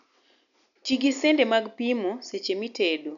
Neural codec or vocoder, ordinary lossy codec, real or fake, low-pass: none; none; real; 7.2 kHz